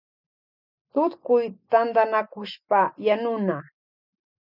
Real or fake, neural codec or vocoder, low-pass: real; none; 5.4 kHz